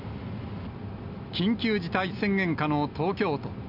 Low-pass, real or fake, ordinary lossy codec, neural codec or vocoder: 5.4 kHz; real; none; none